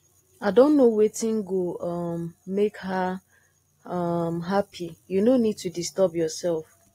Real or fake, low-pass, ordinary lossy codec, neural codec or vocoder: real; 19.8 kHz; AAC, 48 kbps; none